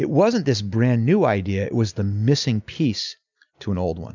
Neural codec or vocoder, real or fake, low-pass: none; real; 7.2 kHz